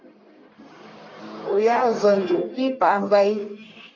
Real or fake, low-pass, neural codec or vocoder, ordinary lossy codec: fake; 7.2 kHz; codec, 44.1 kHz, 1.7 kbps, Pupu-Codec; AAC, 32 kbps